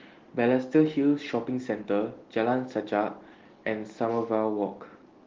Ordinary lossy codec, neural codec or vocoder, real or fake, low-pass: Opus, 16 kbps; none; real; 7.2 kHz